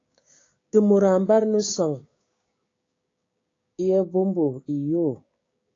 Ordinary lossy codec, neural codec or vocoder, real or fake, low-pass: AAC, 32 kbps; codec, 16 kHz, 6 kbps, DAC; fake; 7.2 kHz